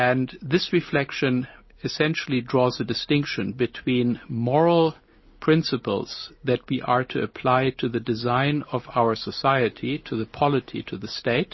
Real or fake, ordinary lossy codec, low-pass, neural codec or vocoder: real; MP3, 24 kbps; 7.2 kHz; none